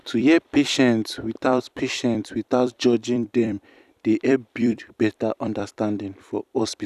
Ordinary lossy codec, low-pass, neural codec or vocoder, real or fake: none; 14.4 kHz; vocoder, 44.1 kHz, 128 mel bands every 256 samples, BigVGAN v2; fake